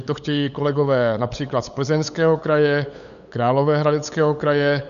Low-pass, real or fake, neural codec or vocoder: 7.2 kHz; fake; codec, 16 kHz, 8 kbps, FunCodec, trained on LibriTTS, 25 frames a second